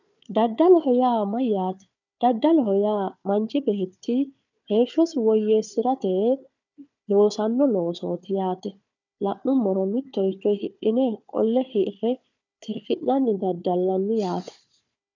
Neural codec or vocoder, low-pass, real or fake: codec, 16 kHz, 4 kbps, FunCodec, trained on Chinese and English, 50 frames a second; 7.2 kHz; fake